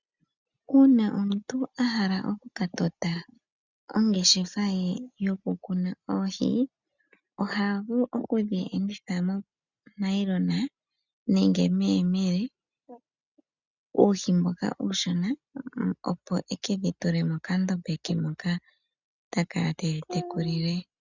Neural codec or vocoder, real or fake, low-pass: none; real; 7.2 kHz